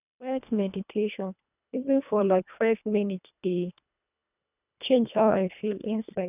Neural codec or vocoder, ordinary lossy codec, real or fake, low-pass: codec, 24 kHz, 1.5 kbps, HILCodec; none; fake; 3.6 kHz